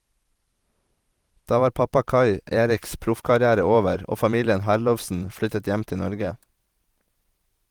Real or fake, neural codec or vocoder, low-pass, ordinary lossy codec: fake; vocoder, 44.1 kHz, 128 mel bands every 512 samples, BigVGAN v2; 19.8 kHz; Opus, 24 kbps